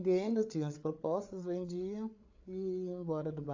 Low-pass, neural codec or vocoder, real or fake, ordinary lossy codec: 7.2 kHz; codec, 16 kHz, 8 kbps, FreqCodec, larger model; fake; AAC, 48 kbps